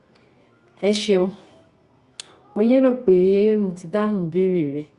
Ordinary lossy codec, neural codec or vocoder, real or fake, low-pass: Opus, 64 kbps; codec, 24 kHz, 0.9 kbps, WavTokenizer, medium music audio release; fake; 10.8 kHz